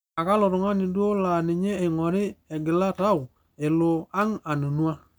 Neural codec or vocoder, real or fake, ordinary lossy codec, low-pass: none; real; none; none